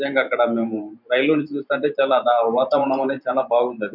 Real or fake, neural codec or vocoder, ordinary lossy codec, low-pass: fake; vocoder, 44.1 kHz, 128 mel bands every 512 samples, BigVGAN v2; none; 5.4 kHz